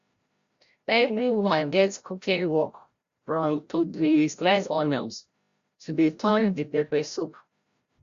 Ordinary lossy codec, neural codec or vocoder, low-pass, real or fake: Opus, 64 kbps; codec, 16 kHz, 0.5 kbps, FreqCodec, larger model; 7.2 kHz; fake